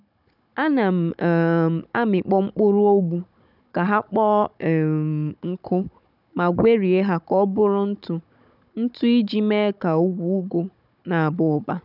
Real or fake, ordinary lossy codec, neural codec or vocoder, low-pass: fake; none; codec, 16 kHz, 16 kbps, FunCodec, trained on Chinese and English, 50 frames a second; 5.4 kHz